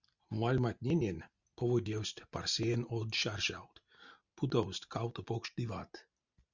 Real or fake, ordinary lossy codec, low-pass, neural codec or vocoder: real; Opus, 64 kbps; 7.2 kHz; none